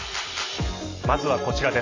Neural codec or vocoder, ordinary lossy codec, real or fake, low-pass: none; AAC, 32 kbps; real; 7.2 kHz